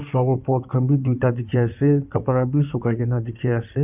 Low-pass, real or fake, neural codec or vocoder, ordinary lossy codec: 3.6 kHz; fake; codec, 16 kHz in and 24 kHz out, 2.2 kbps, FireRedTTS-2 codec; none